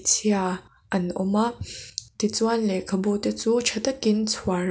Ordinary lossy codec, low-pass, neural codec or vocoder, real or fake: none; none; none; real